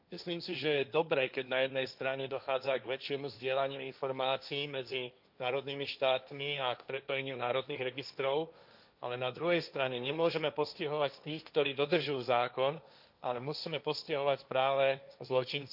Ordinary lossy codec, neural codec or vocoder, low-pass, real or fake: none; codec, 16 kHz, 1.1 kbps, Voila-Tokenizer; 5.4 kHz; fake